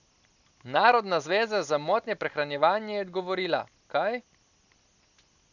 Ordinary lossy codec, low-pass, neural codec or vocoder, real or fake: none; 7.2 kHz; none; real